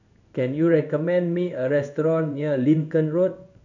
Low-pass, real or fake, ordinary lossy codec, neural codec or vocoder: 7.2 kHz; fake; none; codec, 16 kHz in and 24 kHz out, 1 kbps, XY-Tokenizer